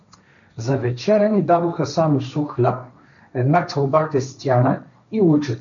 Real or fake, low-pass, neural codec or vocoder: fake; 7.2 kHz; codec, 16 kHz, 1.1 kbps, Voila-Tokenizer